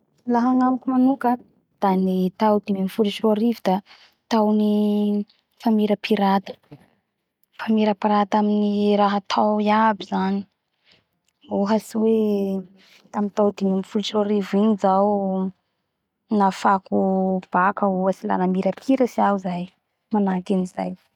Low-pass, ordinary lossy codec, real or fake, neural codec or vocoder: 19.8 kHz; none; real; none